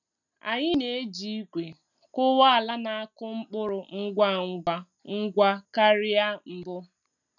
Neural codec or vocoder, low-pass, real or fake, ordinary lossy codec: none; 7.2 kHz; real; none